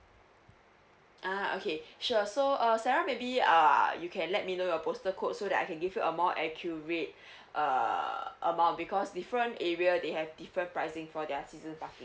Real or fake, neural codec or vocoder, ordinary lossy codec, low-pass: real; none; none; none